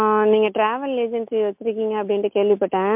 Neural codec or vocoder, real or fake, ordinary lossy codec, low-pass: none; real; MP3, 32 kbps; 3.6 kHz